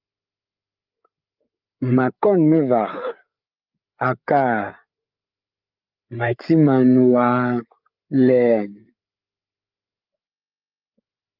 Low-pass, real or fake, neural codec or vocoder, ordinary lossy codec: 5.4 kHz; fake; codec, 16 kHz, 8 kbps, FreqCodec, larger model; Opus, 24 kbps